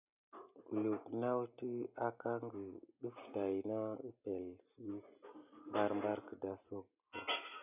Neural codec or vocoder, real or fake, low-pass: none; real; 3.6 kHz